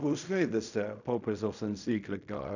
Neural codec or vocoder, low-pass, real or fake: codec, 16 kHz in and 24 kHz out, 0.4 kbps, LongCat-Audio-Codec, fine tuned four codebook decoder; 7.2 kHz; fake